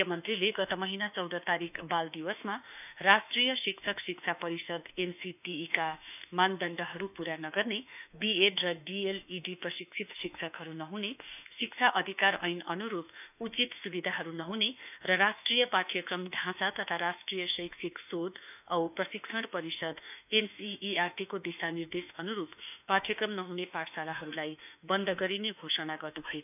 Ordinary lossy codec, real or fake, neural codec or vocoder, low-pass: none; fake; autoencoder, 48 kHz, 32 numbers a frame, DAC-VAE, trained on Japanese speech; 3.6 kHz